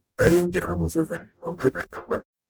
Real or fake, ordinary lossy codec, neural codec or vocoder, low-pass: fake; none; codec, 44.1 kHz, 0.9 kbps, DAC; none